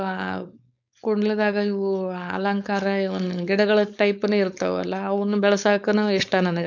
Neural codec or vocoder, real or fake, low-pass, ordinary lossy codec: codec, 16 kHz, 4.8 kbps, FACodec; fake; 7.2 kHz; none